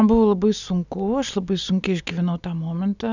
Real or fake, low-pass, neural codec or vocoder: real; 7.2 kHz; none